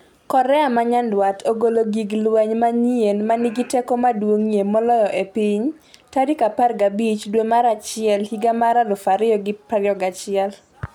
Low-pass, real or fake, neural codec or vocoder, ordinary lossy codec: 19.8 kHz; real; none; none